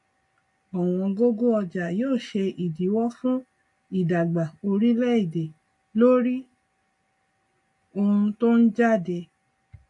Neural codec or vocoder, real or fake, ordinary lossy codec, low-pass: none; real; MP3, 48 kbps; 10.8 kHz